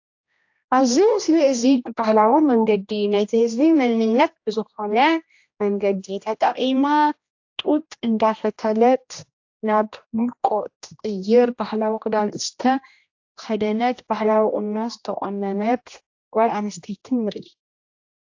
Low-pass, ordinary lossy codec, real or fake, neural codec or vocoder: 7.2 kHz; AAC, 48 kbps; fake; codec, 16 kHz, 1 kbps, X-Codec, HuBERT features, trained on general audio